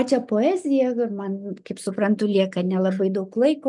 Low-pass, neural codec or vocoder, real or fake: 10.8 kHz; none; real